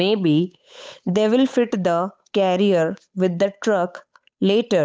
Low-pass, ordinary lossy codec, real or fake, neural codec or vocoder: none; none; fake; codec, 16 kHz, 8 kbps, FunCodec, trained on Chinese and English, 25 frames a second